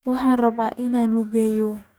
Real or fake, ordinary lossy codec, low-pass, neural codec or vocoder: fake; none; none; codec, 44.1 kHz, 2.6 kbps, DAC